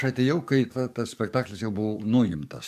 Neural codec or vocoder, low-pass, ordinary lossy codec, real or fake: codec, 44.1 kHz, 7.8 kbps, DAC; 14.4 kHz; MP3, 96 kbps; fake